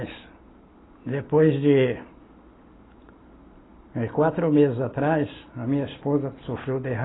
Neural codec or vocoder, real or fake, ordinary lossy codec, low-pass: none; real; AAC, 16 kbps; 7.2 kHz